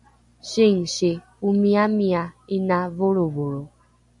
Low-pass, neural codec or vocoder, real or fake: 10.8 kHz; none; real